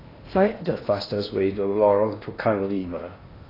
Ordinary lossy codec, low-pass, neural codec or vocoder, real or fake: AAC, 24 kbps; 5.4 kHz; codec, 16 kHz in and 24 kHz out, 0.8 kbps, FocalCodec, streaming, 65536 codes; fake